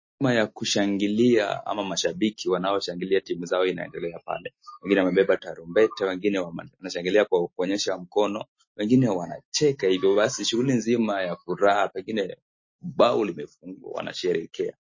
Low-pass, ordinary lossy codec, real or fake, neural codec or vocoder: 7.2 kHz; MP3, 32 kbps; real; none